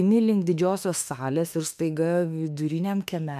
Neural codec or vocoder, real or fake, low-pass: autoencoder, 48 kHz, 32 numbers a frame, DAC-VAE, trained on Japanese speech; fake; 14.4 kHz